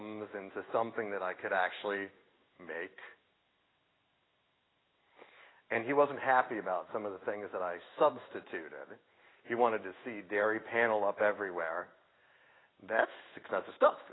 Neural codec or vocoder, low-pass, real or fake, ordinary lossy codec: codec, 16 kHz in and 24 kHz out, 1 kbps, XY-Tokenizer; 7.2 kHz; fake; AAC, 16 kbps